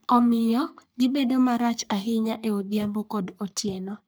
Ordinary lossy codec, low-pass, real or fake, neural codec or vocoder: none; none; fake; codec, 44.1 kHz, 3.4 kbps, Pupu-Codec